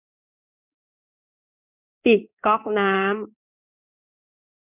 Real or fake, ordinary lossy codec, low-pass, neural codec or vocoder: fake; none; 3.6 kHz; codec, 16 kHz in and 24 kHz out, 1 kbps, XY-Tokenizer